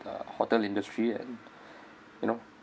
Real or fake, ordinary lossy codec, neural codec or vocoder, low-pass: real; none; none; none